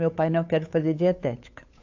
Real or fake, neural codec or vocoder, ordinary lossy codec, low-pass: fake; codec, 16 kHz, 8 kbps, FunCodec, trained on Chinese and English, 25 frames a second; AAC, 48 kbps; 7.2 kHz